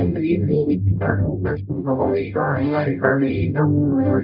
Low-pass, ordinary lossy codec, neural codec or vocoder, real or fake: 5.4 kHz; none; codec, 44.1 kHz, 0.9 kbps, DAC; fake